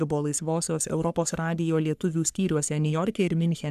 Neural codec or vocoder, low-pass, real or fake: codec, 44.1 kHz, 3.4 kbps, Pupu-Codec; 14.4 kHz; fake